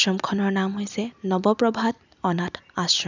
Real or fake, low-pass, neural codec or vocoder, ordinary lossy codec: real; 7.2 kHz; none; none